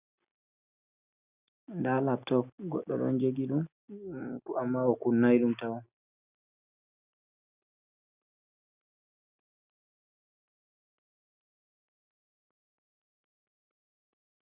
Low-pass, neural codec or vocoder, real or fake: 3.6 kHz; none; real